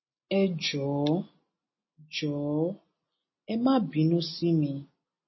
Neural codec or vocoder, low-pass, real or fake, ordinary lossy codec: none; 7.2 kHz; real; MP3, 24 kbps